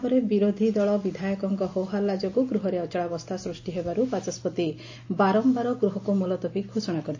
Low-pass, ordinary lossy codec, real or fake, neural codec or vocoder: 7.2 kHz; AAC, 48 kbps; real; none